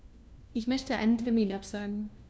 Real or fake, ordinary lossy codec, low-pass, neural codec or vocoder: fake; none; none; codec, 16 kHz, 1 kbps, FunCodec, trained on LibriTTS, 50 frames a second